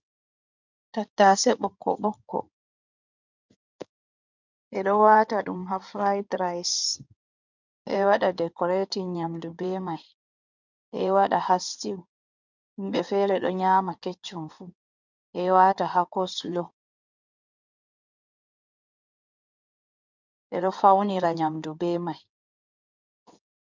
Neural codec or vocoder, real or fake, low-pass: codec, 16 kHz in and 24 kHz out, 2.2 kbps, FireRedTTS-2 codec; fake; 7.2 kHz